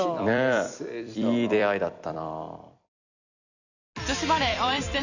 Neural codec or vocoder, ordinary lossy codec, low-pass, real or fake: vocoder, 44.1 kHz, 128 mel bands every 256 samples, BigVGAN v2; none; 7.2 kHz; fake